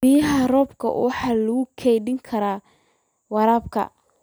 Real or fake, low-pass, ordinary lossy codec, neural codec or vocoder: real; none; none; none